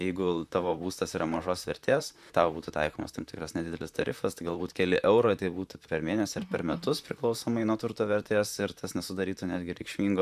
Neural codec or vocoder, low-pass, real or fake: vocoder, 44.1 kHz, 128 mel bands, Pupu-Vocoder; 14.4 kHz; fake